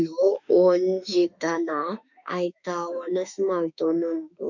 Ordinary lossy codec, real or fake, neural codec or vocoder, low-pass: none; fake; autoencoder, 48 kHz, 32 numbers a frame, DAC-VAE, trained on Japanese speech; 7.2 kHz